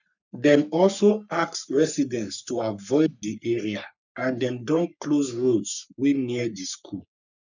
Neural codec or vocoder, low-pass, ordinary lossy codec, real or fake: codec, 44.1 kHz, 3.4 kbps, Pupu-Codec; 7.2 kHz; none; fake